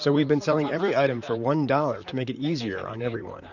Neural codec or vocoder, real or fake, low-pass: vocoder, 44.1 kHz, 128 mel bands, Pupu-Vocoder; fake; 7.2 kHz